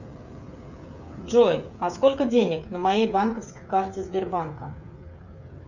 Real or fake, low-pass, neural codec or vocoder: fake; 7.2 kHz; codec, 16 kHz, 8 kbps, FreqCodec, smaller model